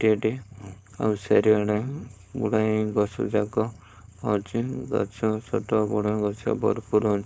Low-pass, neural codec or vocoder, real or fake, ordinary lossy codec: none; codec, 16 kHz, 4.8 kbps, FACodec; fake; none